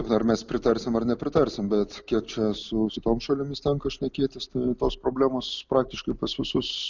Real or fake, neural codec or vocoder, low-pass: real; none; 7.2 kHz